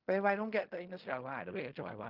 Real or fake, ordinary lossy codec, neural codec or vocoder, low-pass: fake; Opus, 24 kbps; codec, 16 kHz in and 24 kHz out, 0.4 kbps, LongCat-Audio-Codec, fine tuned four codebook decoder; 5.4 kHz